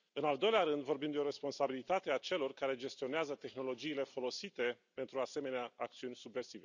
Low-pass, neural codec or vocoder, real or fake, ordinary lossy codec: 7.2 kHz; none; real; none